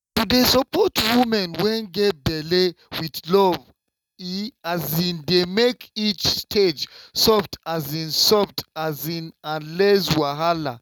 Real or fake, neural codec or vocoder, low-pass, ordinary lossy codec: real; none; 19.8 kHz; none